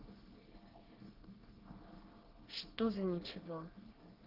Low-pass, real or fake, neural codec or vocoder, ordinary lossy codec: 5.4 kHz; fake; codec, 24 kHz, 1 kbps, SNAC; Opus, 16 kbps